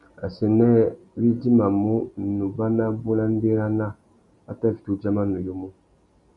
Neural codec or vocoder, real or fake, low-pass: none; real; 10.8 kHz